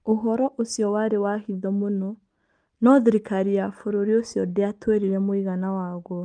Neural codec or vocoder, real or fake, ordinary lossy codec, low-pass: none; real; Opus, 32 kbps; 9.9 kHz